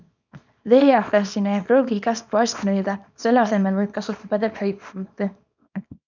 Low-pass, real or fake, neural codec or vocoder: 7.2 kHz; fake; codec, 24 kHz, 0.9 kbps, WavTokenizer, small release